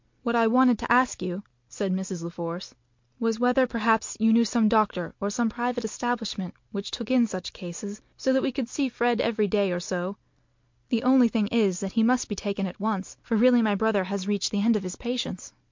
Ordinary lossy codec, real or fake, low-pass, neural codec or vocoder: MP3, 48 kbps; real; 7.2 kHz; none